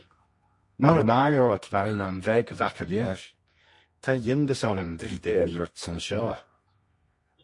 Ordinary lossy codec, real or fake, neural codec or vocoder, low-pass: MP3, 48 kbps; fake; codec, 24 kHz, 0.9 kbps, WavTokenizer, medium music audio release; 10.8 kHz